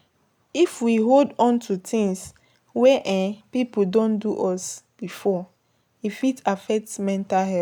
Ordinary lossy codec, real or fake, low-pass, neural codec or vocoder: none; real; 19.8 kHz; none